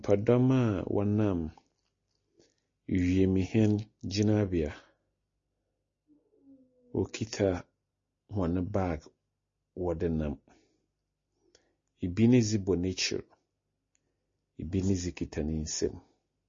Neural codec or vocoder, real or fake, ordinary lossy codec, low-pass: none; real; MP3, 32 kbps; 7.2 kHz